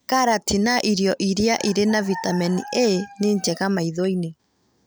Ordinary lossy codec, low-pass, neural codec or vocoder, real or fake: none; none; none; real